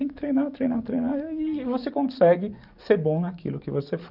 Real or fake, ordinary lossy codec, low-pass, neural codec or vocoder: fake; none; 5.4 kHz; codec, 44.1 kHz, 7.8 kbps, DAC